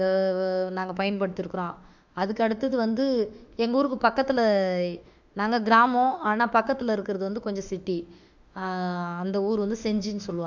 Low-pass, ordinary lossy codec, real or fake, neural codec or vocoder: 7.2 kHz; none; fake; autoencoder, 48 kHz, 32 numbers a frame, DAC-VAE, trained on Japanese speech